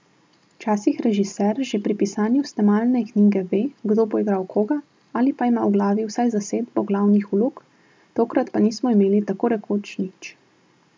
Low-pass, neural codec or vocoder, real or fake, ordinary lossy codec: none; none; real; none